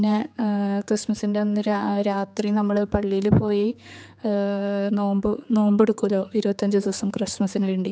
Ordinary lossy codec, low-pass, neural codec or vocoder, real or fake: none; none; codec, 16 kHz, 4 kbps, X-Codec, HuBERT features, trained on general audio; fake